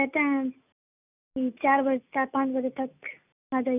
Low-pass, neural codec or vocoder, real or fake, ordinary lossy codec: 3.6 kHz; none; real; none